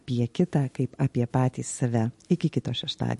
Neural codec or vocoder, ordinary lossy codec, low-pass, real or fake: none; MP3, 48 kbps; 14.4 kHz; real